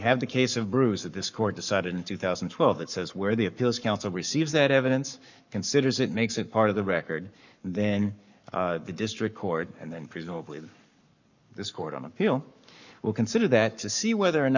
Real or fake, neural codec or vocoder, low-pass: fake; codec, 44.1 kHz, 7.8 kbps, Pupu-Codec; 7.2 kHz